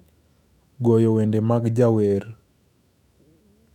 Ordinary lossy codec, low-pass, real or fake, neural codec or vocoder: none; 19.8 kHz; fake; autoencoder, 48 kHz, 128 numbers a frame, DAC-VAE, trained on Japanese speech